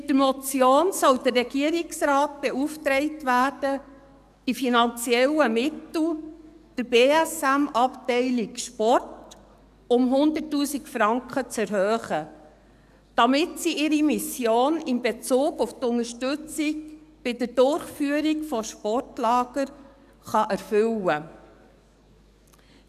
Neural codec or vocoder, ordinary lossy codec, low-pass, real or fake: codec, 44.1 kHz, 7.8 kbps, DAC; none; 14.4 kHz; fake